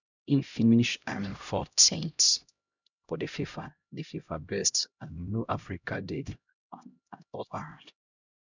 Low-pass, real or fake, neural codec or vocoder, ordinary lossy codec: 7.2 kHz; fake; codec, 16 kHz, 0.5 kbps, X-Codec, HuBERT features, trained on LibriSpeech; none